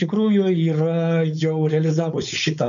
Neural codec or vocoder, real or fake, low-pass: codec, 16 kHz, 4.8 kbps, FACodec; fake; 7.2 kHz